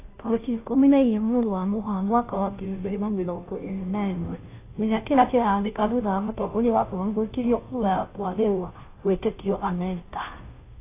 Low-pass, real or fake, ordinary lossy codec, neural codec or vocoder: 3.6 kHz; fake; AAC, 24 kbps; codec, 16 kHz, 0.5 kbps, FunCodec, trained on Chinese and English, 25 frames a second